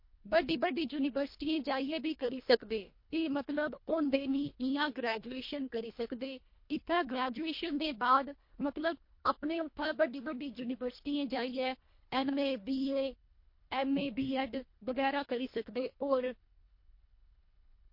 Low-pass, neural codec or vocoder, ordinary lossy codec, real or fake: 5.4 kHz; codec, 24 kHz, 1.5 kbps, HILCodec; MP3, 32 kbps; fake